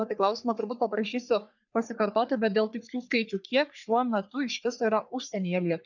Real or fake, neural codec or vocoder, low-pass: fake; codec, 44.1 kHz, 3.4 kbps, Pupu-Codec; 7.2 kHz